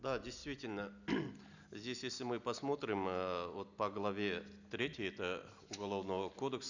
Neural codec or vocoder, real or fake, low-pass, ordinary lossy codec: none; real; 7.2 kHz; none